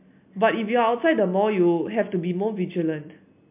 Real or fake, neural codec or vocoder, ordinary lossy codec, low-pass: real; none; MP3, 32 kbps; 3.6 kHz